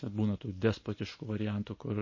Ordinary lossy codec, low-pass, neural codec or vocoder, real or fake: MP3, 32 kbps; 7.2 kHz; none; real